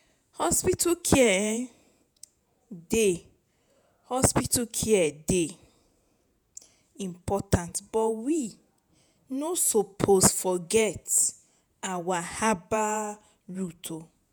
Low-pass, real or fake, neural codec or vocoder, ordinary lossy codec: none; fake; vocoder, 48 kHz, 128 mel bands, Vocos; none